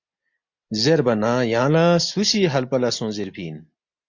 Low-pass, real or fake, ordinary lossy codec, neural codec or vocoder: 7.2 kHz; real; MP3, 48 kbps; none